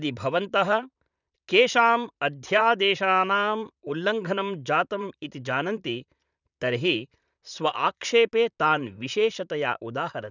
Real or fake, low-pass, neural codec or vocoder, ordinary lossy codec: fake; 7.2 kHz; vocoder, 44.1 kHz, 128 mel bands, Pupu-Vocoder; none